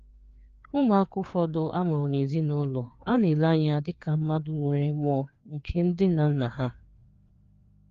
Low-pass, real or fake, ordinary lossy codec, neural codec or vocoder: 7.2 kHz; fake; Opus, 32 kbps; codec, 16 kHz, 2 kbps, FreqCodec, larger model